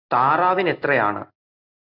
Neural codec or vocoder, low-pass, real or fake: none; 5.4 kHz; real